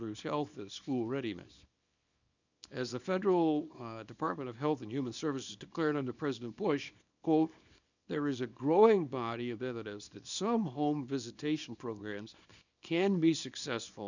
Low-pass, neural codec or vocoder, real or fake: 7.2 kHz; codec, 24 kHz, 0.9 kbps, WavTokenizer, small release; fake